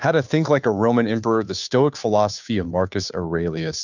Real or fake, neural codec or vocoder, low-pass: fake; codec, 16 kHz, 2 kbps, FunCodec, trained on Chinese and English, 25 frames a second; 7.2 kHz